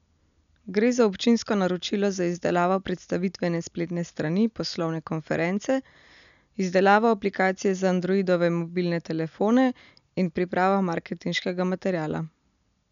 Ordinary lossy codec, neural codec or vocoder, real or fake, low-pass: none; none; real; 7.2 kHz